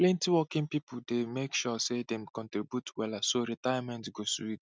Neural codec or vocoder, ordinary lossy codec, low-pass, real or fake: none; none; none; real